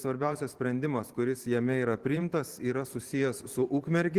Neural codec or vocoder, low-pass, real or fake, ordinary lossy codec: vocoder, 44.1 kHz, 128 mel bands every 512 samples, BigVGAN v2; 14.4 kHz; fake; Opus, 16 kbps